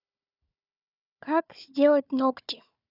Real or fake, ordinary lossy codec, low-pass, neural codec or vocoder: fake; none; 5.4 kHz; codec, 16 kHz, 4 kbps, FunCodec, trained on Chinese and English, 50 frames a second